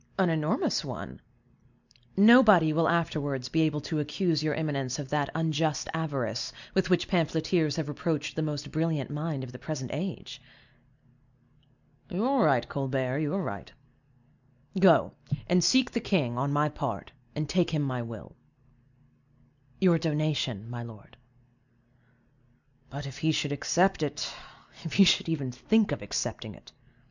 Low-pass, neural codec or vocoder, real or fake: 7.2 kHz; none; real